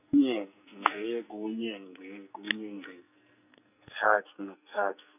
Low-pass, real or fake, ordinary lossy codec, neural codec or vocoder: 3.6 kHz; fake; none; codec, 44.1 kHz, 2.6 kbps, SNAC